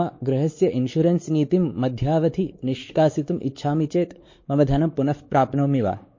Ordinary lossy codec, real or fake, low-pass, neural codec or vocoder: MP3, 32 kbps; fake; 7.2 kHz; codec, 16 kHz, 4 kbps, X-Codec, WavLM features, trained on Multilingual LibriSpeech